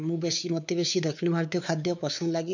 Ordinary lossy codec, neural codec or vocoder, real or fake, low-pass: none; codec, 16 kHz, 4 kbps, X-Codec, WavLM features, trained on Multilingual LibriSpeech; fake; 7.2 kHz